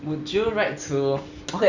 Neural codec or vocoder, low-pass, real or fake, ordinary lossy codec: none; 7.2 kHz; real; none